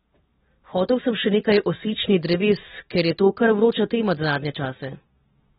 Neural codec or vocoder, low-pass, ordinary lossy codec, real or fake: none; 7.2 kHz; AAC, 16 kbps; real